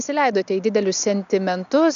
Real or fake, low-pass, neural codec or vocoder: real; 7.2 kHz; none